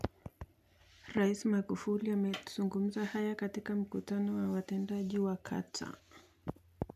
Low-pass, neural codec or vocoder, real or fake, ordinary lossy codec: 14.4 kHz; none; real; none